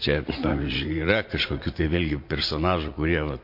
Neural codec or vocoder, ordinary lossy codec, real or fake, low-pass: vocoder, 44.1 kHz, 80 mel bands, Vocos; MP3, 32 kbps; fake; 5.4 kHz